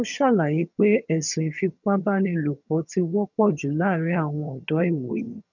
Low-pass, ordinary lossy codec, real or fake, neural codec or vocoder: 7.2 kHz; none; fake; vocoder, 22.05 kHz, 80 mel bands, HiFi-GAN